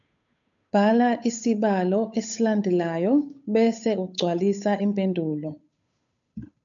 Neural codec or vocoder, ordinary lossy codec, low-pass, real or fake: codec, 16 kHz, 8 kbps, FunCodec, trained on Chinese and English, 25 frames a second; MP3, 96 kbps; 7.2 kHz; fake